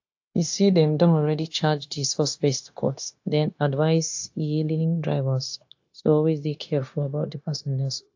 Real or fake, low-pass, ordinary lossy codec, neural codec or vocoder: fake; 7.2 kHz; AAC, 48 kbps; codec, 16 kHz, 0.9 kbps, LongCat-Audio-Codec